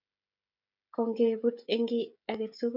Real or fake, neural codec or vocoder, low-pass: fake; codec, 16 kHz, 16 kbps, FreqCodec, smaller model; 5.4 kHz